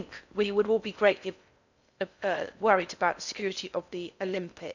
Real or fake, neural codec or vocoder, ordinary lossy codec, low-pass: fake; codec, 16 kHz in and 24 kHz out, 0.6 kbps, FocalCodec, streaming, 4096 codes; none; 7.2 kHz